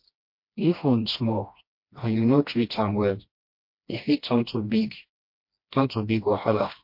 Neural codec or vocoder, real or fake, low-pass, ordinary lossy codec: codec, 16 kHz, 1 kbps, FreqCodec, smaller model; fake; 5.4 kHz; MP3, 32 kbps